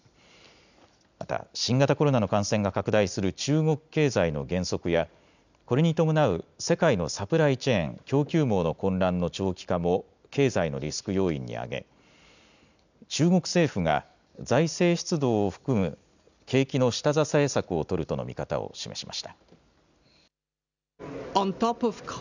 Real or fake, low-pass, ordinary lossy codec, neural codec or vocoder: real; 7.2 kHz; none; none